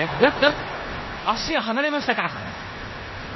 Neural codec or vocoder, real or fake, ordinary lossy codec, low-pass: codec, 16 kHz in and 24 kHz out, 0.9 kbps, LongCat-Audio-Codec, four codebook decoder; fake; MP3, 24 kbps; 7.2 kHz